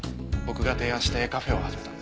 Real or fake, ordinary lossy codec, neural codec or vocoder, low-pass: real; none; none; none